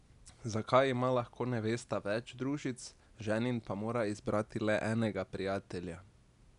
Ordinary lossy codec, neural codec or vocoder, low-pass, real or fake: none; none; 10.8 kHz; real